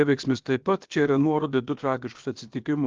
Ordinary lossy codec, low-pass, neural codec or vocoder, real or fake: Opus, 32 kbps; 7.2 kHz; codec, 16 kHz, about 1 kbps, DyCAST, with the encoder's durations; fake